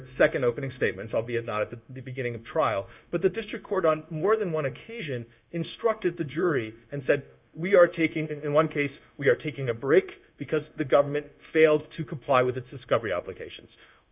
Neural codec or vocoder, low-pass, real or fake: codec, 16 kHz, 0.9 kbps, LongCat-Audio-Codec; 3.6 kHz; fake